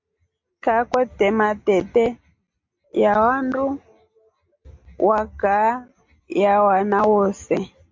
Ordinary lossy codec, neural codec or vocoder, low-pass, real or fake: MP3, 48 kbps; none; 7.2 kHz; real